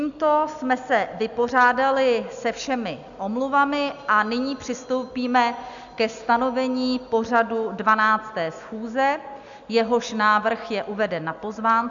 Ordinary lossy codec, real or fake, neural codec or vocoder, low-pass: MP3, 96 kbps; real; none; 7.2 kHz